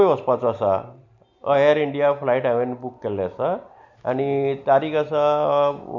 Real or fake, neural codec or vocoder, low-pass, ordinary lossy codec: real; none; 7.2 kHz; none